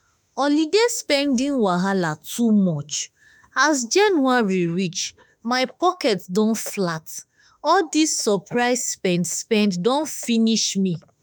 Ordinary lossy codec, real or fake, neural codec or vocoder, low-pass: none; fake; autoencoder, 48 kHz, 32 numbers a frame, DAC-VAE, trained on Japanese speech; none